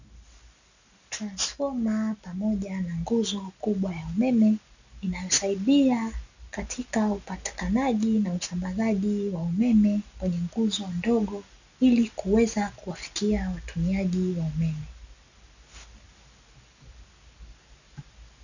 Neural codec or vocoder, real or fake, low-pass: none; real; 7.2 kHz